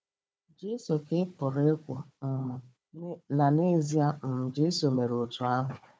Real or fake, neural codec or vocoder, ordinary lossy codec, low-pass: fake; codec, 16 kHz, 4 kbps, FunCodec, trained on Chinese and English, 50 frames a second; none; none